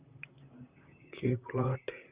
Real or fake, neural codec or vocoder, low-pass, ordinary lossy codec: fake; vocoder, 44.1 kHz, 128 mel bands, Pupu-Vocoder; 3.6 kHz; Opus, 64 kbps